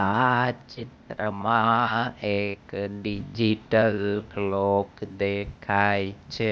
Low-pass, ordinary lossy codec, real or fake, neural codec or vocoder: none; none; fake; codec, 16 kHz, 0.8 kbps, ZipCodec